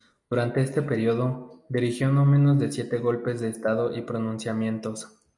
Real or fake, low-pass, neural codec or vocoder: real; 10.8 kHz; none